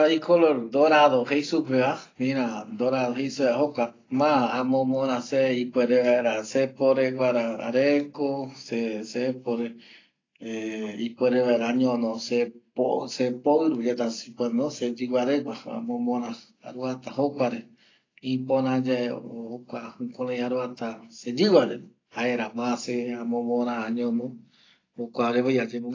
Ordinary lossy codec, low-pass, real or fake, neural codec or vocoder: AAC, 32 kbps; 7.2 kHz; real; none